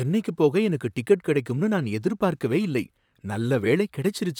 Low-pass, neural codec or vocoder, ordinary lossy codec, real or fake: 19.8 kHz; vocoder, 44.1 kHz, 128 mel bands, Pupu-Vocoder; none; fake